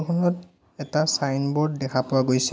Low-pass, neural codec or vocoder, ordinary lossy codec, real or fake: none; none; none; real